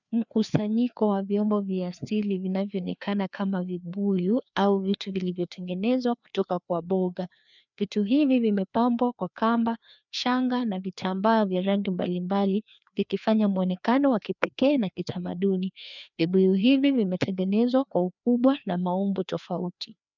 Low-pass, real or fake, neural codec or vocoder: 7.2 kHz; fake; codec, 16 kHz, 2 kbps, FreqCodec, larger model